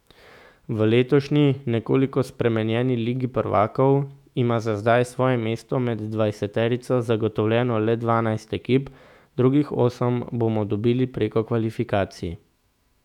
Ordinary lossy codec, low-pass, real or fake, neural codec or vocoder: none; 19.8 kHz; fake; autoencoder, 48 kHz, 128 numbers a frame, DAC-VAE, trained on Japanese speech